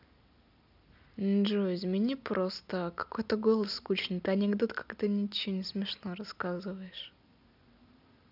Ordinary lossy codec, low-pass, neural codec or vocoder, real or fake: none; 5.4 kHz; none; real